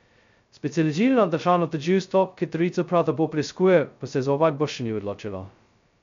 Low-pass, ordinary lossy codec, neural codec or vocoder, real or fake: 7.2 kHz; MP3, 64 kbps; codec, 16 kHz, 0.2 kbps, FocalCodec; fake